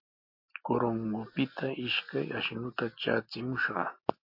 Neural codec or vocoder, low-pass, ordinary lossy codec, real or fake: none; 5.4 kHz; MP3, 32 kbps; real